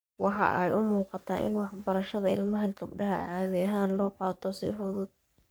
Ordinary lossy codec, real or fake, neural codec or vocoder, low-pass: none; fake; codec, 44.1 kHz, 3.4 kbps, Pupu-Codec; none